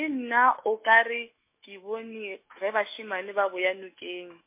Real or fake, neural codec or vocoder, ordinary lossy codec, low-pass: fake; autoencoder, 48 kHz, 128 numbers a frame, DAC-VAE, trained on Japanese speech; MP3, 24 kbps; 3.6 kHz